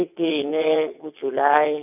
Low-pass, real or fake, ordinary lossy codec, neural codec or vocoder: 3.6 kHz; fake; none; vocoder, 22.05 kHz, 80 mel bands, WaveNeXt